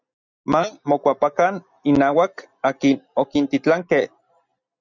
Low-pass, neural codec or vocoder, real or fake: 7.2 kHz; none; real